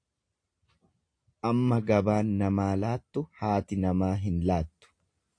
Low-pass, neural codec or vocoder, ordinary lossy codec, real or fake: 9.9 kHz; none; AAC, 48 kbps; real